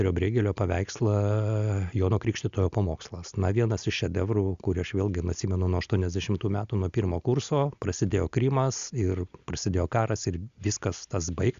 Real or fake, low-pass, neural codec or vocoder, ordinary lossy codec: real; 7.2 kHz; none; Opus, 64 kbps